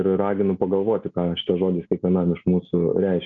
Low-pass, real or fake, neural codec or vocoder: 7.2 kHz; real; none